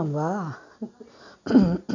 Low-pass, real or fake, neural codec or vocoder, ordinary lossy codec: 7.2 kHz; real; none; none